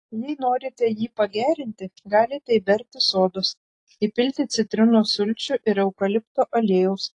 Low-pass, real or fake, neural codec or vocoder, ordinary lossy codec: 10.8 kHz; real; none; AAC, 48 kbps